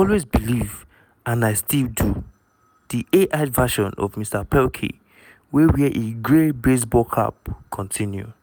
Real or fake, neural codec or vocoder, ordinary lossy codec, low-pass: real; none; none; none